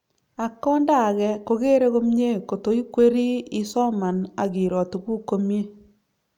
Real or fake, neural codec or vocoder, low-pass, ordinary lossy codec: real; none; 19.8 kHz; none